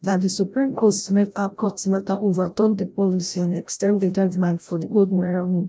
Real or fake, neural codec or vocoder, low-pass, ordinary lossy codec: fake; codec, 16 kHz, 0.5 kbps, FreqCodec, larger model; none; none